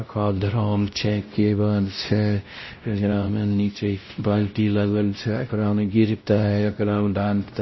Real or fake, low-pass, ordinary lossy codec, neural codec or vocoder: fake; 7.2 kHz; MP3, 24 kbps; codec, 16 kHz, 0.5 kbps, X-Codec, WavLM features, trained on Multilingual LibriSpeech